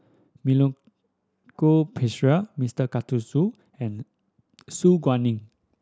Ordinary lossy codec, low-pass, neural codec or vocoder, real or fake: none; none; none; real